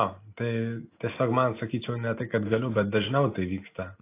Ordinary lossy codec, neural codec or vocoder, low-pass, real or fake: AAC, 24 kbps; none; 3.6 kHz; real